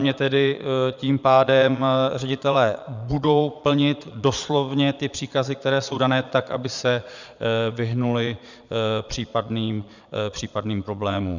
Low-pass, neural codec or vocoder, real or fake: 7.2 kHz; vocoder, 22.05 kHz, 80 mel bands, Vocos; fake